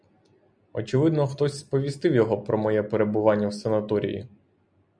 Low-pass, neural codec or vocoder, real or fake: 9.9 kHz; none; real